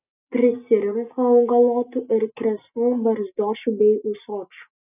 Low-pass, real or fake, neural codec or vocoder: 3.6 kHz; real; none